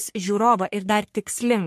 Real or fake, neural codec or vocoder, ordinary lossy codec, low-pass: fake; codec, 44.1 kHz, 3.4 kbps, Pupu-Codec; MP3, 64 kbps; 14.4 kHz